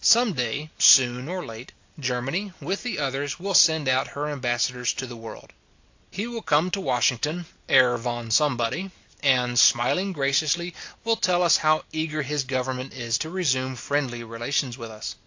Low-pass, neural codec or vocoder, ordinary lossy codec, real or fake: 7.2 kHz; none; AAC, 48 kbps; real